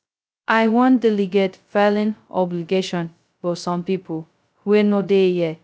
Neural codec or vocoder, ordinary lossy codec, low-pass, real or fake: codec, 16 kHz, 0.2 kbps, FocalCodec; none; none; fake